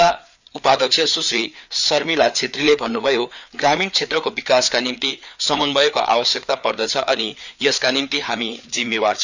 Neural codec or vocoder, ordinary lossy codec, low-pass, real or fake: codec, 16 kHz, 4 kbps, FreqCodec, larger model; none; 7.2 kHz; fake